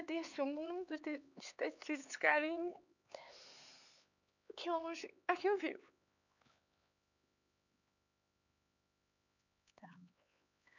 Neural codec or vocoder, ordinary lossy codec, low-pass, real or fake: codec, 16 kHz, 4 kbps, X-Codec, HuBERT features, trained on LibriSpeech; none; 7.2 kHz; fake